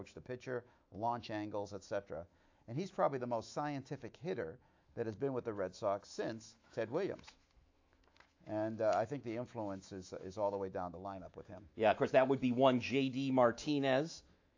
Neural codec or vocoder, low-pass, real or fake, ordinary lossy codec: autoencoder, 48 kHz, 128 numbers a frame, DAC-VAE, trained on Japanese speech; 7.2 kHz; fake; AAC, 48 kbps